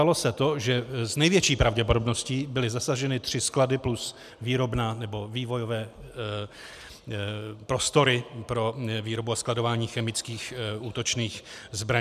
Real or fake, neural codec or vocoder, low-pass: real; none; 14.4 kHz